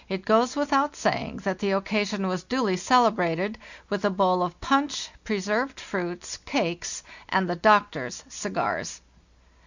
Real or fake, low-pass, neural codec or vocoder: real; 7.2 kHz; none